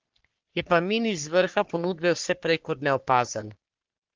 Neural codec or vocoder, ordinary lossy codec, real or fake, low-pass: codec, 44.1 kHz, 3.4 kbps, Pupu-Codec; Opus, 16 kbps; fake; 7.2 kHz